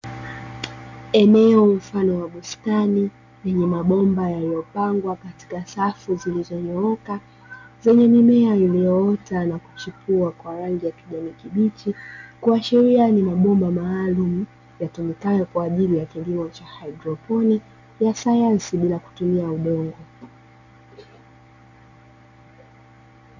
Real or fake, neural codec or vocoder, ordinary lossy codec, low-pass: real; none; MP3, 64 kbps; 7.2 kHz